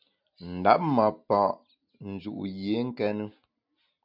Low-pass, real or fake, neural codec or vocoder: 5.4 kHz; real; none